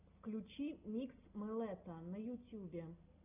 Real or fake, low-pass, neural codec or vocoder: real; 3.6 kHz; none